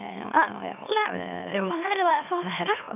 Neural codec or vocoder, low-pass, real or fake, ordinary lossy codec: autoencoder, 44.1 kHz, a latent of 192 numbers a frame, MeloTTS; 3.6 kHz; fake; none